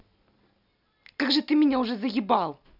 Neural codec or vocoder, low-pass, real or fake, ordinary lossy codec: none; 5.4 kHz; real; none